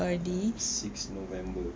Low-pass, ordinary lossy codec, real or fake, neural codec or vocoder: none; none; real; none